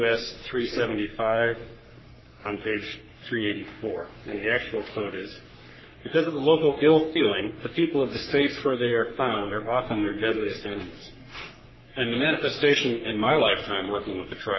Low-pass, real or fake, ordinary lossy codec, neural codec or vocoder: 7.2 kHz; fake; MP3, 24 kbps; codec, 44.1 kHz, 3.4 kbps, Pupu-Codec